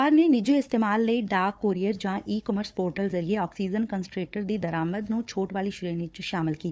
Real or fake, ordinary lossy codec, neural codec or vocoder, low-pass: fake; none; codec, 16 kHz, 4 kbps, FunCodec, trained on Chinese and English, 50 frames a second; none